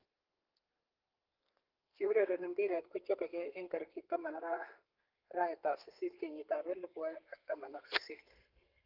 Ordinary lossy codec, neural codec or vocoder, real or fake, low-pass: Opus, 32 kbps; codec, 32 kHz, 1.9 kbps, SNAC; fake; 5.4 kHz